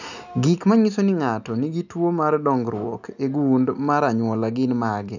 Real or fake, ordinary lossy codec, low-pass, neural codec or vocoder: real; none; 7.2 kHz; none